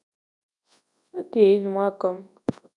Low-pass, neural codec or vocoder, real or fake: 10.8 kHz; codec, 24 kHz, 0.9 kbps, WavTokenizer, large speech release; fake